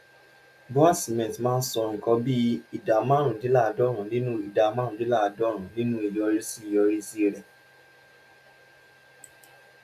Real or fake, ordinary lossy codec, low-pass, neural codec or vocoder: real; none; 14.4 kHz; none